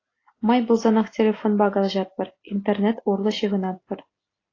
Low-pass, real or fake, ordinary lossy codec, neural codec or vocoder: 7.2 kHz; real; AAC, 32 kbps; none